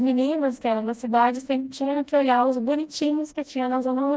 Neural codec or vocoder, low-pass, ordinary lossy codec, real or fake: codec, 16 kHz, 1 kbps, FreqCodec, smaller model; none; none; fake